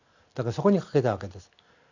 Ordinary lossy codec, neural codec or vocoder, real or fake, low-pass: none; none; real; 7.2 kHz